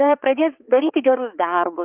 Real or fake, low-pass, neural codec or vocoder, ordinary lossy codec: fake; 3.6 kHz; codec, 16 kHz, 4 kbps, X-Codec, HuBERT features, trained on balanced general audio; Opus, 24 kbps